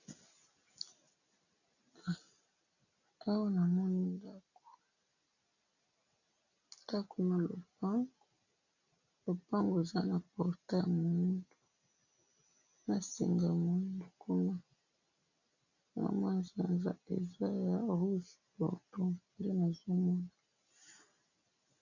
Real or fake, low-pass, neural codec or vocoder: real; 7.2 kHz; none